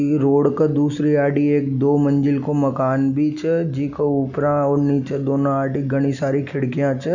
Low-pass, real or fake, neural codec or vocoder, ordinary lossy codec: 7.2 kHz; real; none; none